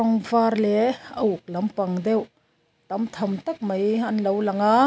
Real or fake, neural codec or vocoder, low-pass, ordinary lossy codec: real; none; none; none